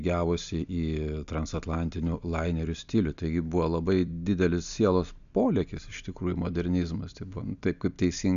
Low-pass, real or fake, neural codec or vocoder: 7.2 kHz; real; none